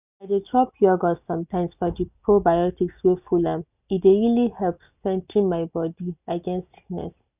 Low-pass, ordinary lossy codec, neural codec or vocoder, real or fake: 3.6 kHz; none; none; real